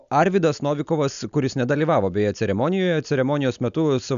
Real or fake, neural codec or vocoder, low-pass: real; none; 7.2 kHz